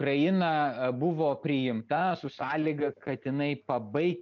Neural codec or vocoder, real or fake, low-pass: none; real; 7.2 kHz